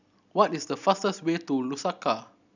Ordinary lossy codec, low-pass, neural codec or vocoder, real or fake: none; 7.2 kHz; none; real